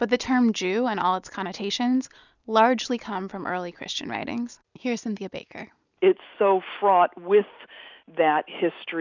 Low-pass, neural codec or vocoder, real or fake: 7.2 kHz; none; real